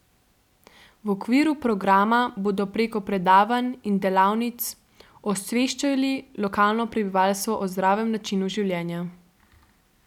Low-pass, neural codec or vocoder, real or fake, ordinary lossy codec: 19.8 kHz; none; real; none